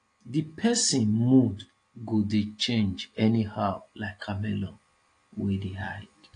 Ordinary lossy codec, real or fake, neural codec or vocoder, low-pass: AAC, 48 kbps; real; none; 9.9 kHz